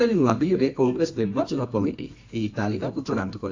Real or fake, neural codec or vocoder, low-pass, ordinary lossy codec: fake; codec, 24 kHz, 0.9 kbps, WavTokenizer, medium music audio release; 7.2 kHz; AAC, 48 kbps